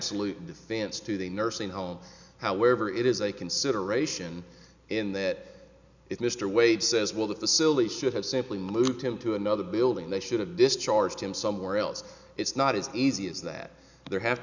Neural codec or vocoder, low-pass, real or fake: none; 7.2 kHz; real